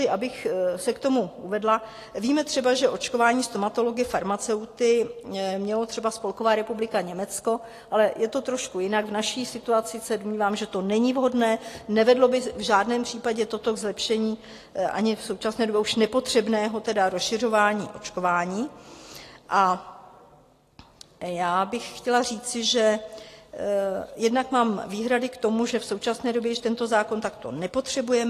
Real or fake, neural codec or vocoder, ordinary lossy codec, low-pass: real; none; AAC, 48 kbps; 14.4 kHz